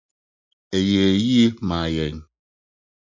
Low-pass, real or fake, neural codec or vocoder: 7.2 kHz; real; none